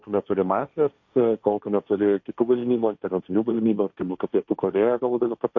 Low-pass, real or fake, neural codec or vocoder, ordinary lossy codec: 7.2 kHz; fake; codec, 16 kHz, 1.1 kbps, Voila-Tokenizer; MP3, 48 kbps